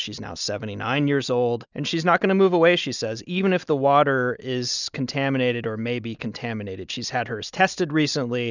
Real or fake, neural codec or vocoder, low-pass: real; none; 7.2 kHz